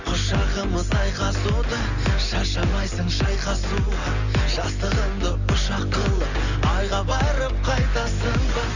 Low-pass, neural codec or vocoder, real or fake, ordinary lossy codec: 7.2 kHz; none; real; none